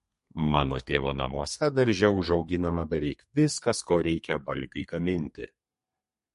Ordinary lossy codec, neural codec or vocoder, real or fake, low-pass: MP3, 48 kbps; codec, 32 kHz, 1.9 kbps, SNAC; fake; 14.4 kHz